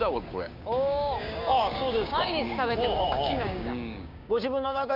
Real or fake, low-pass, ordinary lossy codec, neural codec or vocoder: fake; 5.4 kHz; MP3, 48 kbps; codec, 16 kHz, 6 kbps, DAC